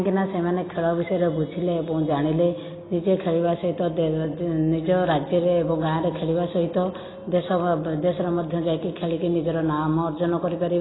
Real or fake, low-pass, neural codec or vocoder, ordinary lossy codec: real; 7.2 kHz; none; AAC, 16 kbps